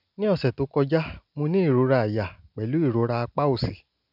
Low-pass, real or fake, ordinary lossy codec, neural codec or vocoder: 5.4 kHz; real; none; none